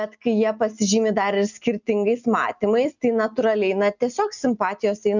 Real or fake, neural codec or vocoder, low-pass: real; none; 7.2 kHz